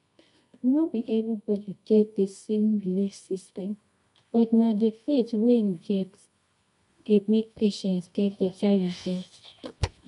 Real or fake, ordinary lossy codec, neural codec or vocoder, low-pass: fake; none; codec, 24 kHz, 0.9 kbps, WavTokenizer, medium music audio release; 10.8 kHz